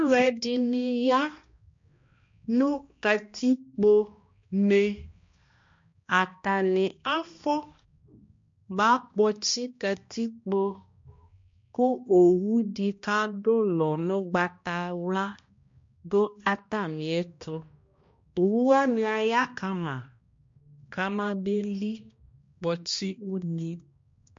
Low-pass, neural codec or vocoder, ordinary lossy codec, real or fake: 7.2 kHz; codec, 16 kHz, 1 kbps, X-Codec, HuBERT features, trained on balanced general audio; MP3, 48 kbps; fake